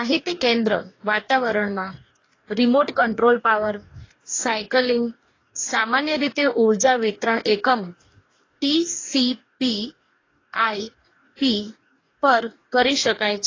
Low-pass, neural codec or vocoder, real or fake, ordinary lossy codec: 7.2 kHz; codec, 44.1 kHz, 2.6 kbps, DAC; fake; AAC, 32 kbps